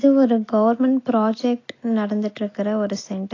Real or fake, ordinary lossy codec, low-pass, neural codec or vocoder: real; AAC, 32 kbps; 7.2 kHz; none